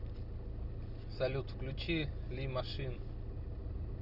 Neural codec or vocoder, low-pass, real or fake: none; 5.4 kHz; real